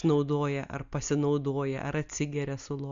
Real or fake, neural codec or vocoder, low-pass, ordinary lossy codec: real; none; 7.2 kHz; Opus, 64 kbps